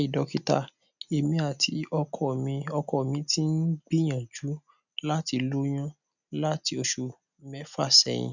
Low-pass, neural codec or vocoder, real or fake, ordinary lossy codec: 7.2 kHz; none; real; none